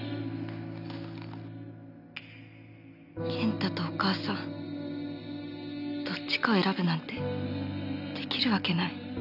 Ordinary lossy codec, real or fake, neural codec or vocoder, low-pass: none; real; none; 5.4 kHz